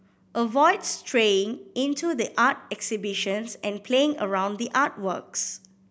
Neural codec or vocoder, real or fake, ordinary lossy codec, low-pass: none; real; none; none